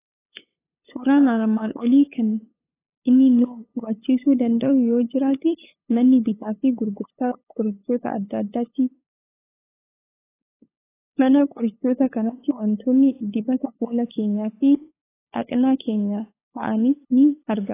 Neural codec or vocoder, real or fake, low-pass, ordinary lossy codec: codec, 16 kHz, 8 kbps, FunCodec, trained on LibriTTS, 25 frames a second; fake; 3.6 kHz; AAC, 16 kbps